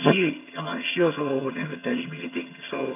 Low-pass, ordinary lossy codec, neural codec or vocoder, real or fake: 3.6 kHz; none; vocoder, 22.05 kHz, 80 mel bands, HiFi-GAN; fake